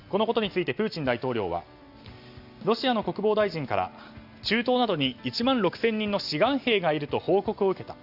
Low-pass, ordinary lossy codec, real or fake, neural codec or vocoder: 5.4 kHz; Opus, 64 kbps; real; none